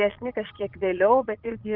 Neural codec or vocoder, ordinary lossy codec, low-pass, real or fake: vocoder, 22.05 kHz, 80 mel bands, Vocos; Opus, 32 kbps; 5.4 kHz; fake